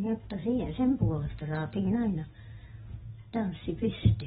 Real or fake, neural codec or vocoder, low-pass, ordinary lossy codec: real; none; 19.8 kHz; AAC, 16 kbps